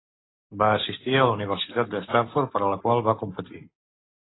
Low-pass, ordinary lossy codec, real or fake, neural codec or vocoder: 7.2 kHz; AAC, 16 kbps; real; none